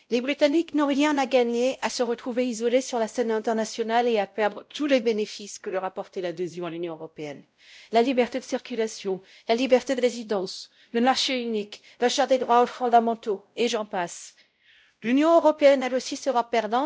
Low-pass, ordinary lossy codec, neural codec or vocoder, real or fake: none; none; codec, 16 kHz, 0.5 kbps, X-Codec, WavLM features, trained on Multilingual LibriSpeech; fake